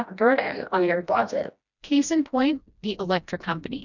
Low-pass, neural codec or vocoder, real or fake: 7.2 kHz; codec, 16 kHz, 1 kbps, FreqCodec, smaller model; fake